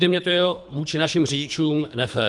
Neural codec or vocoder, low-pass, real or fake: codec, 24 kHz, 3 kbps, HILCodec; 10.8 kHz; fake